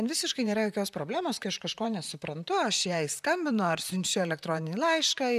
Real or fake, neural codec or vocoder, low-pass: fake; vocoder, 44.1 kHz, 128 mel bands, Pupu-Vocoder; 14.4 kHz